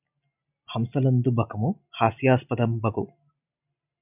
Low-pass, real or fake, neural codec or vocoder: 3.6 kHz; real; none